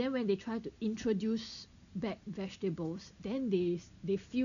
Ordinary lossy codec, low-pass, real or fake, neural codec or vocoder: none; 7.2 kHz; real; none